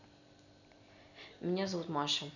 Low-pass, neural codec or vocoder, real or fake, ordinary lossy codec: 7.2 kHz; none; real; none